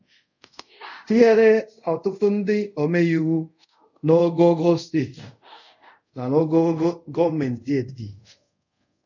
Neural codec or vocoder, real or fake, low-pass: codec, 24 kHz, 0.5 kbps, DualCodec; fake; 7.2 kHz